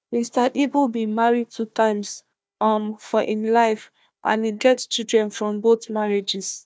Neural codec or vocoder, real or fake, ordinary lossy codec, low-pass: codec, 16 kHz, 1 kbps, FunCodec, trained on Chinese and English, 50 frames a second; fake; none; none